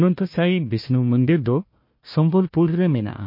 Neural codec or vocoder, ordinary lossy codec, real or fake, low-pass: codec, 16 kHz, 1 kbps, FunCodec, trained on Chinese and English, 50 frames a second; MP3, 32 kbps; fake; 5.4 kHz